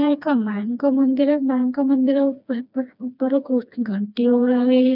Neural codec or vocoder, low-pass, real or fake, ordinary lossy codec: codec, 16 kHz, 2 kbps, FreqCodec, smaller model; 5.4 kHz; fake; none